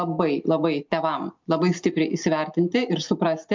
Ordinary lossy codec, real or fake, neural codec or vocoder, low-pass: MP3, 64 kbps; real; none; 7.2 kHz